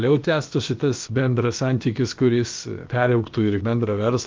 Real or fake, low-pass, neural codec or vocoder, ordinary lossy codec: fake; 7.2 kHz; codec, 16 kHz, about 1 kbps, DyCAST, with the encoder's durations; Opus, 24 kbps